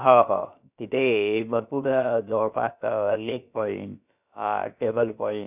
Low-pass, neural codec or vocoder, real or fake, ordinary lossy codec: 3.6 kHz; codec, 16 kHz, about 1 kbps, DyCAST, with the encoder's durations; fake; none